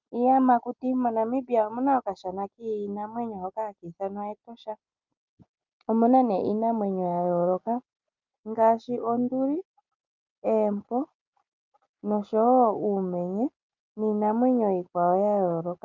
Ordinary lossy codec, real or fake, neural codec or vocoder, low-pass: Opus, 24 kbps; real; none; 7.2 kHz